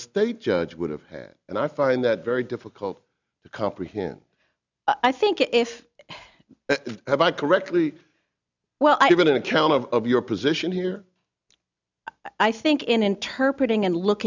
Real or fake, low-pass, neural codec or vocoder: real; 7.2 kHz; none